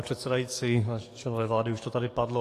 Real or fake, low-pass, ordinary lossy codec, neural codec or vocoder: fake; 14.4 kHz; AAC, 48 kbps; codec, 44.1 kHz, 7.8 kbps, DAC